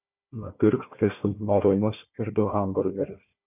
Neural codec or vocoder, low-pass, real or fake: codec, 16 kHz, 1 kbps, FunCodec, trained on Chinese and English, 50 frames a second; 3.6 kHz; fake